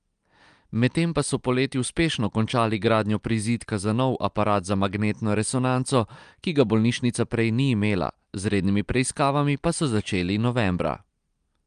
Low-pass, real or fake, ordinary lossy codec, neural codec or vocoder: 9.9 kHz; real; Opus, 32 kbps; none